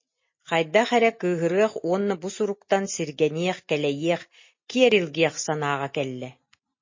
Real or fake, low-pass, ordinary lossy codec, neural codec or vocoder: real; 7.2 kHz; MP3, 32 kbps; none